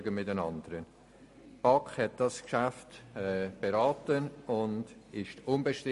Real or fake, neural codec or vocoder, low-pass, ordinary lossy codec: real; none; 14.4 kHz; MP3, 48 kbps